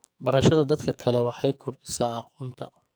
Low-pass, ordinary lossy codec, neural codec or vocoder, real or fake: none; none; codec, 44.1 kHz, 2.6 kbps, SNAC; fake